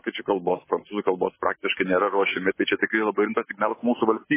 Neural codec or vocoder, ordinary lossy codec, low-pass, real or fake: codec, 44.1 kHz, 7.8 kbps, Pupu-Codec; MP3, 16 kbps; 3.6 kHz; fake